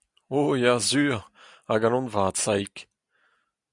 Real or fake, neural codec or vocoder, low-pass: real; none; 10.8 kHz